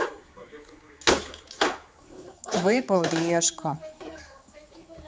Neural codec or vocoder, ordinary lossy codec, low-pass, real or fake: codec, 16 kHz, 4 kbps, X-Codec, HuBERT features, trained on general audio; none; none; fake